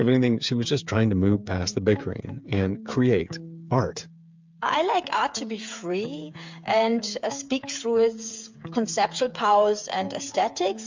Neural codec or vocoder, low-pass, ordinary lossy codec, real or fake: codec, 16 kHz, 8 kbps, FreqCodec, smaller model; 7.2 kHz; MP3, 64 kbps; fake